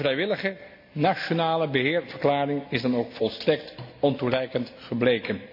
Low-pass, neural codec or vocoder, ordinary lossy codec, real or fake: 5.4 kHz; none; MP3, 48 kbps; real